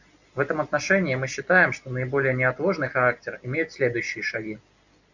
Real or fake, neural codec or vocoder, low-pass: real; none; 7.2 kHz